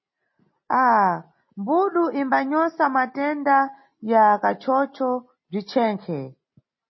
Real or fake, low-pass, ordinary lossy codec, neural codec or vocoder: real; 7.2 kHz; MP3, 24 kbps; none